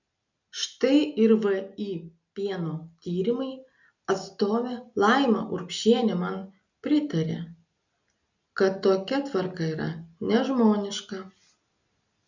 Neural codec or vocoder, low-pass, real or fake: none; 7.2 kHz; real